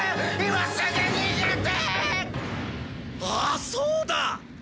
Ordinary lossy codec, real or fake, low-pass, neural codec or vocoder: none; real; none; none